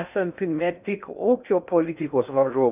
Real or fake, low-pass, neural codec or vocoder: fake; 3.6 kHz; codec, 16 kHz in and 24 kHz out, 0.6 kbps, FocalCodec, streaming, 2048 codes